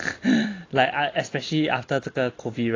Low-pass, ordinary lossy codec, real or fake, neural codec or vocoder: 7.2 kHz; AAC, 32 kbps; real; none